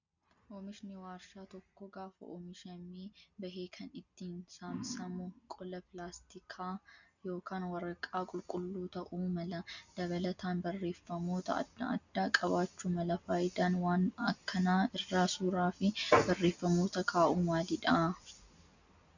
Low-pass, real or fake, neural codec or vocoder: 7.2 kHz; real; none